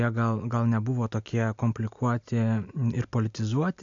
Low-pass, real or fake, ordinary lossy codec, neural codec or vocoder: 7.2 kHz; real; AAC, 64 kbps; none